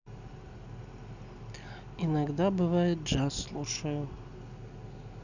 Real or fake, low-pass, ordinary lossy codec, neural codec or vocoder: real; 7.2 kHz; none; none